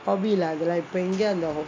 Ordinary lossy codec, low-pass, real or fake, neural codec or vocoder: AAC, 32 kbps; 7.2 kHz; real; none